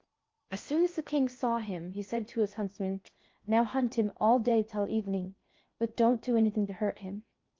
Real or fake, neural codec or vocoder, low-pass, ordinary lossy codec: fake; codec, 16 kHz in and 24 kHz out, 0.6 kbps, FocalCodec, streaming, 4096 codes; 7.2 kHz; Opus, 32 kbps